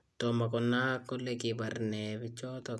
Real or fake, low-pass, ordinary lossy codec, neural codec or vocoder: real; none; none; none